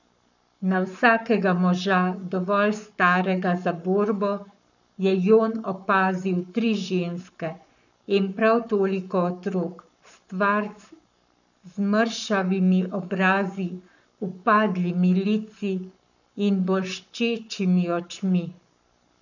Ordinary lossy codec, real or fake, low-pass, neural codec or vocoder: none; fake; 7.2 kHz; codec, 44.1 kHz, 7.8 kbps, Pupu-Codec